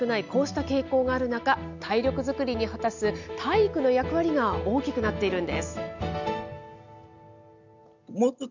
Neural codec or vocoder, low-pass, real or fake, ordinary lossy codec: none; 7.2 kHz; real; none